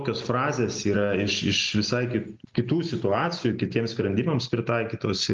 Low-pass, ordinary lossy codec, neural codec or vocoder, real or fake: 7.2 kHz; Opus, 32 kbps; none; real